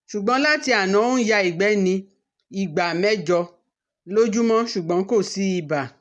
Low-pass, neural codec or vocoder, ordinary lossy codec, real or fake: 10.8 kHz; none; none; real